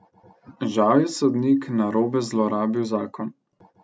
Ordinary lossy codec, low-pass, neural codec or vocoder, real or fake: none; none; none; real